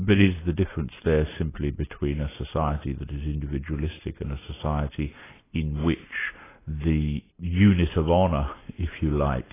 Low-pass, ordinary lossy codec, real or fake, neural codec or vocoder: 3.6 kHz; AAC, 16 kbps; real; none